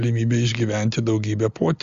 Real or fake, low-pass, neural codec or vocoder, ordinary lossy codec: real; 7.2 kHz; none; Opus, 32 kbps